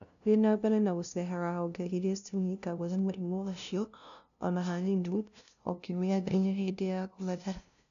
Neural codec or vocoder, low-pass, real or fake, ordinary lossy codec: codec, 16 kHz, 0.5 kbps, FunCodec, trained on LibriTTS, 25 frames a second; 7.2 kHz; fake; none